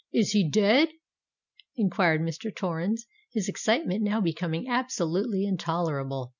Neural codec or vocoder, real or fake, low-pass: none; real; 7.2 kHz